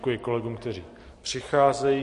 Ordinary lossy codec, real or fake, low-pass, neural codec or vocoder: MP3, 48 kbps; real; 14.4 kHz; none